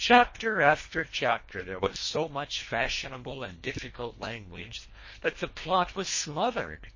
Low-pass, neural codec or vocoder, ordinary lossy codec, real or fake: 7.2 kHz; codec, 24 kHz, 1.5 kbps, HILCodec; MP3, 32 kbps; fake